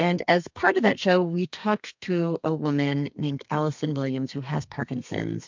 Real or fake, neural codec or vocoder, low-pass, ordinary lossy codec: fake; codec, 32 kHz, 1.9 kbps, SNAC; 7.2 kHz; MP3, 64 kbps